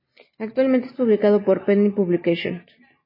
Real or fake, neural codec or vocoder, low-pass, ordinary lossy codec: real; none; 5.4 kHz; MP3, 24 kbps